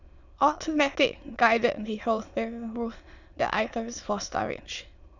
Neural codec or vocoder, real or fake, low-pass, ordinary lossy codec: autoencoder, 22.05 kHz, a latent of 192 numbers a frame, VITS, trained on many speakers; fake; 7.2 kHz; none